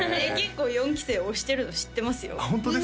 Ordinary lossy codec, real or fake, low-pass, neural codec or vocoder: none; real; none; none